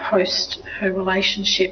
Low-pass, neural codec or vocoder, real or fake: 7.2 kHz; none; real